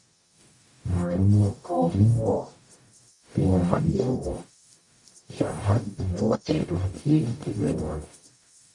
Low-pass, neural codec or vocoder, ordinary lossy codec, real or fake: 10.8 kHz; codec, 44.1 kHz, 0.9 kbps, DAC; MP3, 48 kbps; fake